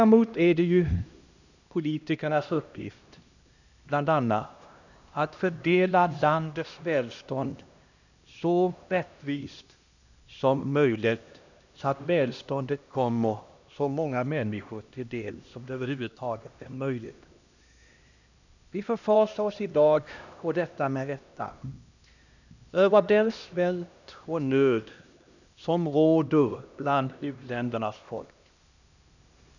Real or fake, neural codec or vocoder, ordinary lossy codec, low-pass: fake; codec, 16 kHz, 1 kbps, X-Codec, HuBERT features, trained on LibriSpeech; none; 7.2 kHz